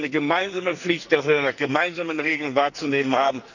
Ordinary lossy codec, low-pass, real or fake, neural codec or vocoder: none; 7.2 kHz; fake; codec, 44.1 kHz, 2.6 kbps, SNAC